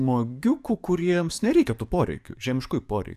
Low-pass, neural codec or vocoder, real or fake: 14.4 kHz; codec, 44.1 kHz, 7.8 kbps, DAC; fake